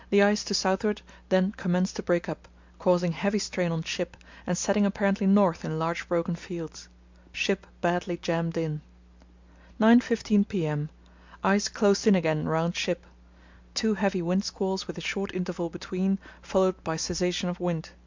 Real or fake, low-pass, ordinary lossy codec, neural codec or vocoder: real; 7.2 kHz; MP3, 64 kbps; none